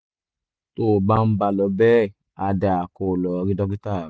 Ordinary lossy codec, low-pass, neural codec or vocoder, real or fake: none; none; none; real